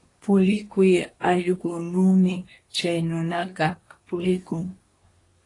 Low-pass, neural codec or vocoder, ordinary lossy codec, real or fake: 10.8 kHz; codec, 24 kHz, 1 kbps, SNAC; AAC, 32 kbps; fake